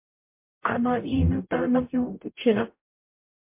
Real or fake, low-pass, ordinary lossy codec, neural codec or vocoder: fake; 3.6 kHz; MP3, 32 kbps; codec, 44.1 kHz, 0.9 kbps, DAC